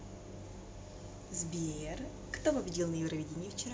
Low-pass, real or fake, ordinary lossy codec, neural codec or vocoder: none; real; none; none